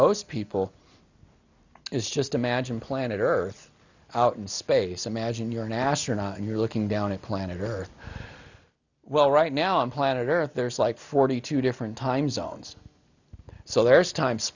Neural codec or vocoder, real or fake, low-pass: none; real; 7.2 kHz